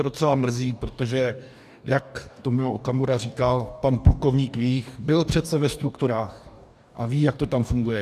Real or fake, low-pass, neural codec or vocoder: fake; 14.4 kHz; codec, 44.1 kHz, 2.6 kbps, DAC